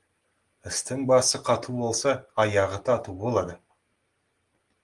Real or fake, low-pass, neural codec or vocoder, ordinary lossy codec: real; 10.8 kHz; none; Opus, 24 kbps